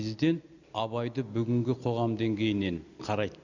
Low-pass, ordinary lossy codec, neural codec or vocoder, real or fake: 7.2 kHz; none; none; real